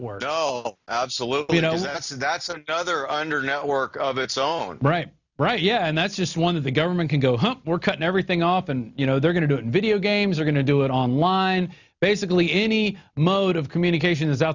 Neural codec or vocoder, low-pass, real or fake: none; 7.2 kHz; real